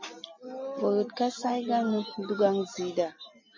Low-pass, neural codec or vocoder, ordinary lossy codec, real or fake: 7.2 kHz; none; MP3, 32 kbps; real